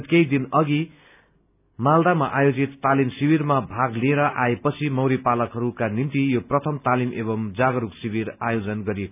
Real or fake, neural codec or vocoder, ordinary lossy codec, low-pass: real; none; none; 3.6 kHz